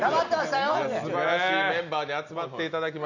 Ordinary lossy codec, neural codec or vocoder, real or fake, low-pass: none; none; real; 7.2 kHz